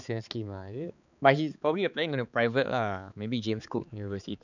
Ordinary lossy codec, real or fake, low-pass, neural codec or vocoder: none; fake; 7.2 kHz; codec, 16 kHz, 4 kbps, X-Codec, HuBERT features, trained on balanced general audio